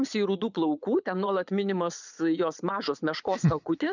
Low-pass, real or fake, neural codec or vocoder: 7.2 kHz; fake; vocoder, 22.05 kHz, 80 mel bands, Vocos